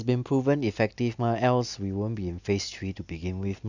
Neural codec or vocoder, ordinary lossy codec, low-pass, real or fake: none; none; 7.2 kHz; real